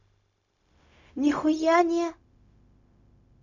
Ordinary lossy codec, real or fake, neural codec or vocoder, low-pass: none; fake; codec, 16 kHz, 0.4 kbps, LongCat-Audio-Codec; 7.2 kHz